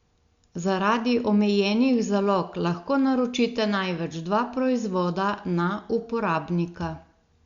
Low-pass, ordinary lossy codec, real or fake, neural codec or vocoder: 7.2 kHz; Opus, 64 kbps; real; none